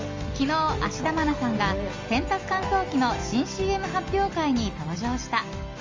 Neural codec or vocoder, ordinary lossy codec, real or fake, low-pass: none; Opus, 32 kbps; real; 7.2 kHz